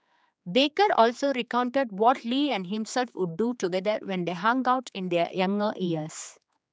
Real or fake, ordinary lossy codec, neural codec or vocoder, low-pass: fake; none; codec, 16 kHz, 4 kbps, X-Codec, HuBERT features, trained on general audio; none